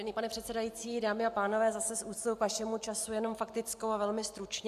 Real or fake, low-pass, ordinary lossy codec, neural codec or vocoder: real; 14.4 kHz; MP3, 96 kbps; none